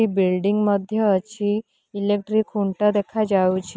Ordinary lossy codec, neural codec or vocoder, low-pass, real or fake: none; none; none; real